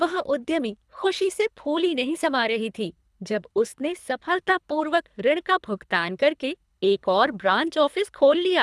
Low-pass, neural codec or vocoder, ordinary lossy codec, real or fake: none; codec, 24 kHz, 3 kbps, HILCodec; none; fake